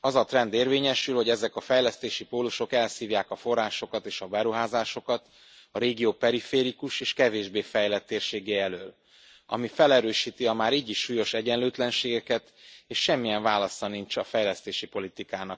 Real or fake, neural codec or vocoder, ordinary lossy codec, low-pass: real; none; none; none